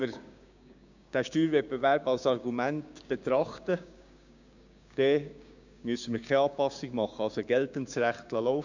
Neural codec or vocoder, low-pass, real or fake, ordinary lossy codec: codec, 16 kHz, 6 kbps, DAC; 7.2 kHz; fake; none